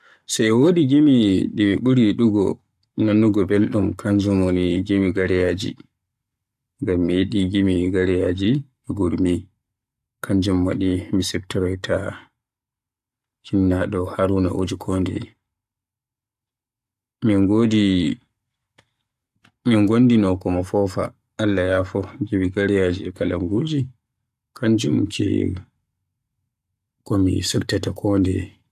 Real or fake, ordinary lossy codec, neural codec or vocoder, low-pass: fake; AAC, 96 kbps; codec, 44.1 kHz, 7.8 kbps, Pupu-Codec; 14.4 kHz